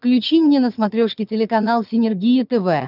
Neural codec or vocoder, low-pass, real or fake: vocoder, 22.05 kHz, 80 mel bands, HiFi-GAN; 5.4 kHz; fake